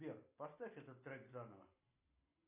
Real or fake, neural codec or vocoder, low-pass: real; none; 3.6 kHz